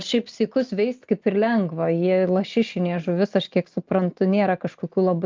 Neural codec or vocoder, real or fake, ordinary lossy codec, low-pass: none; real; Opus, 24 kbps; 7.2 kHz